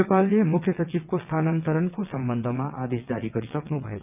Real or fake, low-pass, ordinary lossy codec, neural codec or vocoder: fake; 3.6 kHz; none; vocoder, 22.05 kHz, 80 mel bands, WaveNeXt